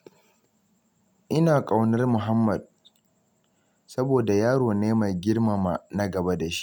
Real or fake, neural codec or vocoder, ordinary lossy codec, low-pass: real; none; none; 19.8 kHz